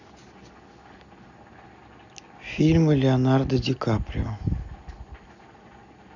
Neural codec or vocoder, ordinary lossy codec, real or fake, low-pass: none; none; real; 7.2 kHz